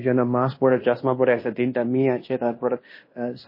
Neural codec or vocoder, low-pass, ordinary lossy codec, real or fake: codec, 16 kHz in and 24 kHz out, 0.9 kbps, LongCat-Audio-Codec, fine tuned four codebook decoder; 5.4 kHz; MP3, 24 kbps; fake